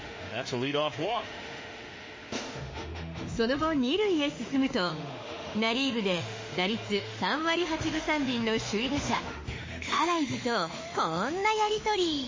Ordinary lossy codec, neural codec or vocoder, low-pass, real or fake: MP3, 32 kbps; autoencoder, 48 kHz, 32 numbers a frame, DAC-VAE, trained on Japanese speech; 7.2 kHz; fake